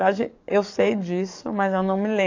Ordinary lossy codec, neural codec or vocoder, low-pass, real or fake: AAC, 48 kbps; codec, 44.1 kHz, 7.8 kbps, DAC; 7.2 kHz; fake